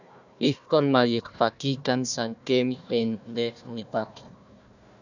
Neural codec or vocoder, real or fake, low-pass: codec, 16 kHz, 1 kbps, FunCodec, trained on Chinese and English, 50 frames a second; fake; 7.2 kHz